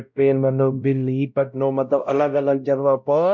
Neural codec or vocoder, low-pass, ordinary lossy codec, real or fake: codec, 16 kHz, 0.5 kbps, X-Codec, WavLM features, trained on Multilingual LibriSpeech; 7.2 kHz; MP3, 64 kbps; fake